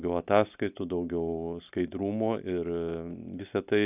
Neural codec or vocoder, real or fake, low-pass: none; real; 3.6 kHz